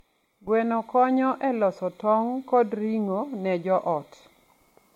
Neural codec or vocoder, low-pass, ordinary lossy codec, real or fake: none; 19.8 kHz; MP3, 64 kbps; real